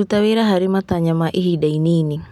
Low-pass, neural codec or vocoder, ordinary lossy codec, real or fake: 19.8 kHz; none; none; real